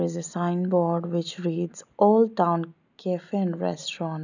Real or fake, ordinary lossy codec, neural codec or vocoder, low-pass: real; none; none; 7.2 kHz